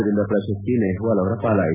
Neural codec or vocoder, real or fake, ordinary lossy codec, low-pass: none; real; none; 3.6 kHz